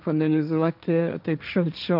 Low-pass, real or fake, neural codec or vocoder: 5.4 kHz; fake; codec, 16 kHz, 1.1 kbps, Voila-Tokenizer